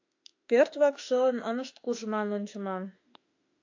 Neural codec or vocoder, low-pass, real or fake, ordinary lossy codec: autoencoder, 48 kHz, 32 numbers a frame, DAC-VAE, trained on Japanese speech; 7.2 kHz; fake; AAC, 48 kbps